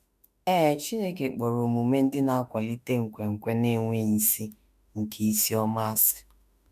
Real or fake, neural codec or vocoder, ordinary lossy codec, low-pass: fake; autoencoder, 48 kHz, 32 numbers a frame, DAC-VAE, trained on Japanese speech; none; 14.4 kHz